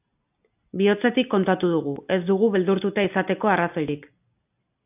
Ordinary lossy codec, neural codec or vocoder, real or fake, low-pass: AAC, 32 kbps; none; real; 3.6 kHz